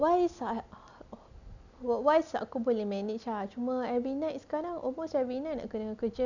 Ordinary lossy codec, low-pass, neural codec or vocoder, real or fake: none; 7.2 kHz; none; real